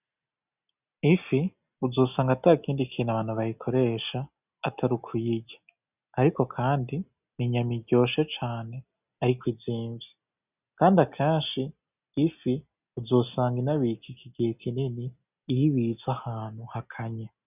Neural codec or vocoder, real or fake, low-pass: none; real; 3.6 kHz